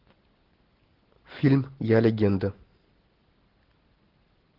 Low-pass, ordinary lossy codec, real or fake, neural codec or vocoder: 5.4 kHz; Opus, 16 kbps; real; none